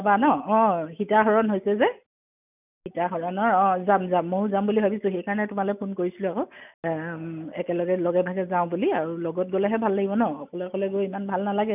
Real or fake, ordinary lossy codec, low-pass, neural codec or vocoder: real; none; 3.6 kHz; none